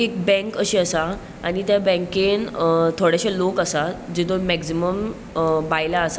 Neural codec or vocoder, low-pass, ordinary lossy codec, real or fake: none; none; none; real